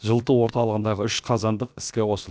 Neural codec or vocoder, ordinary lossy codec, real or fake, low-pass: codec, 16 kHz, about 1 kbps, DyCAST, with the encoder's durations; none; fake; none